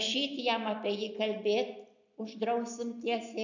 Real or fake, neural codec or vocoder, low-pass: real; none; 7.2 kHz